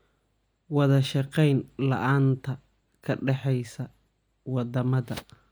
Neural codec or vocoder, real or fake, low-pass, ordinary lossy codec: none; real; none; none